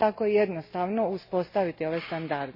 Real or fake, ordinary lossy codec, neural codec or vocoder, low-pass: real; MP3, 24 kbps; none; 5.4 kHz